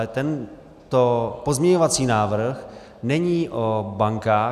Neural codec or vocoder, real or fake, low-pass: none; real; 14.4 kHz